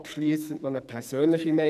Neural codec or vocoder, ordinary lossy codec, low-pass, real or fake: codec, 44.1 kHz, 2.6 kbps, SNAC; none; 14.4 kHz; fake